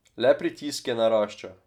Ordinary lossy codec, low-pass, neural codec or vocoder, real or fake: none; 19.8 kHz; none; real